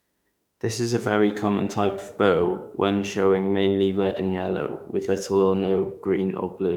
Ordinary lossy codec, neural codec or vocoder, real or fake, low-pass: none; autoencoder, 48 kHz, 32 numbers a frame, DAC-VAE, trained on Japanese speech; fake; 19.8 kHz